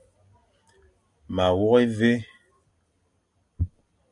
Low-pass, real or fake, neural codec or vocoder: 10.8 kHz; real; none